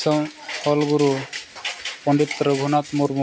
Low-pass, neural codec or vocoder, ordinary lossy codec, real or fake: none; none; none; real